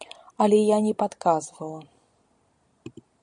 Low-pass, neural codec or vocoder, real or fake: 9.9 kHz; none; real